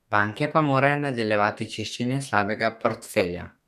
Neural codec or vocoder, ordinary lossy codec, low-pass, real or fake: codec, 32 kHz, 1.9 kbps, SNAC; none; 14.4 kHz; fake